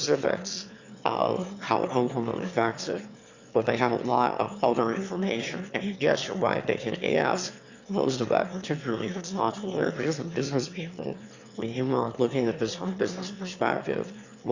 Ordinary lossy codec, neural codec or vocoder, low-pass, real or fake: Opus, 64 kbps; autoencoder, 22.05 kHz, a latent of 192 numbers a frame, VITS, trained on one speaker; 7.2 kHz; fake